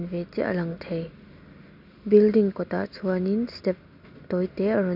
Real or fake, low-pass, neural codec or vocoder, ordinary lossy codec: real; 5.4 kHz; none; none